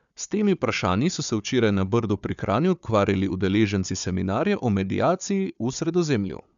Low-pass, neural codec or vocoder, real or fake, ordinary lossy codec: 7.2 kHz; codec, 16 kHz, 4 kbps, FunCodec, trained on Chinese and English, 50 frames a second; fake; none